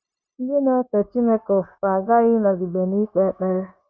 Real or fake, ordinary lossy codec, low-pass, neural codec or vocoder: fake; none; none; codec, 16 kHz, 0.9 kbps, LongCat-Audio-Codec